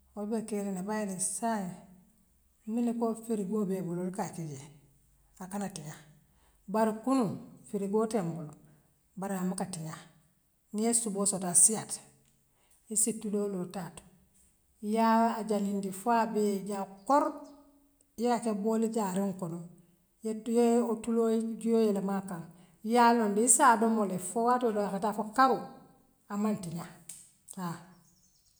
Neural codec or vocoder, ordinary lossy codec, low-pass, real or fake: none; none; none; real